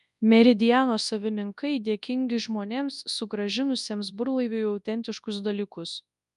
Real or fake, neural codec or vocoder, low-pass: fake; codec, 24 kHz, 0.9 kbps, WavTokenizer, large speech release; 10.8 kHz